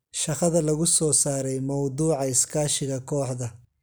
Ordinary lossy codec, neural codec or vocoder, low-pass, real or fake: none; none; none; real